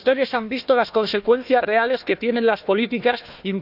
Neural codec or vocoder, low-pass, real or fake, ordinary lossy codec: codec, 16 kHz, 1 kbps, FunCodec, trained on Chinese and English, 50 frames a second; 5.4 kHz; fake; none